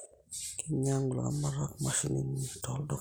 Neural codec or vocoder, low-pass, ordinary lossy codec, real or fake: none; none; none; real